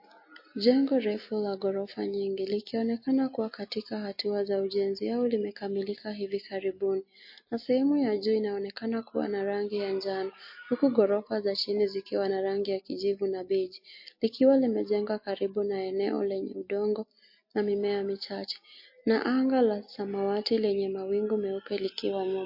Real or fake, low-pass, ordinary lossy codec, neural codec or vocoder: real; 5.4 kHz; MP3, 32 kbps; none